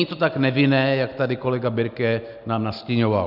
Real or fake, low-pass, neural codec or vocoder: real; 5.4 kHz; none